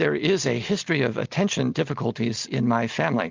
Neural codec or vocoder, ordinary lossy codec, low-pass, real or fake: none; Opus, 32 kbps; 7.2 kHz; real